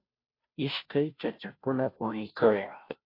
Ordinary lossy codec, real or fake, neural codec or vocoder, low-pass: AAC, 32 kbps; fake; codec, 16 kHz, 0.5 kbps, FunCodec, trained on Chinese and English, 25 frames a second; 5.4 kHz